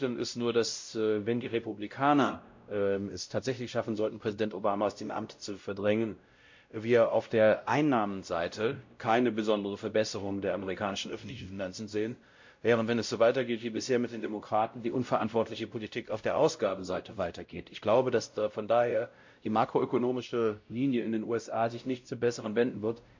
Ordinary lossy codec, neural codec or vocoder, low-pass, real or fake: MP3, 48 kbps; codec, 16 kHz, 0.5 kbps, X-Codec, WavLM features, trained on Multilingual LibriSpeech; 7.2 kHz; fake